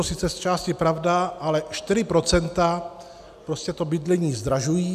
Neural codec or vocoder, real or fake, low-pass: none; real; 14.4 kHz